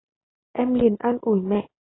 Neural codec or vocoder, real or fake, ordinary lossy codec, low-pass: none; real; AAC, 16 kbps; 7.2 kHz